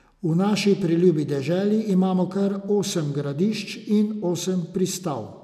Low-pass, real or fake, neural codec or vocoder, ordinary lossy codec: 14.4 kHz; real; none; none